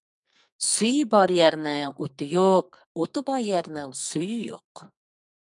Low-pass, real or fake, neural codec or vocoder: 10.8 kHz; fake; codec, 44.1 kHz, 2.6 kbps, SNAC